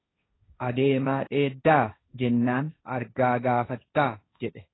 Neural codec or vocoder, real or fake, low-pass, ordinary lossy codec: codec, 16 kHz, 1.1 kbps, Voila-Tokenizer; fake; 7.2 kHz; AAC, 16 kbps